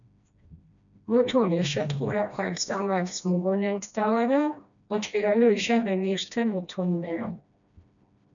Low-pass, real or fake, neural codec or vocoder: 7.2 kHz; fake; codec, 16 kHz, 1 kbps, FreqCodec, smaller model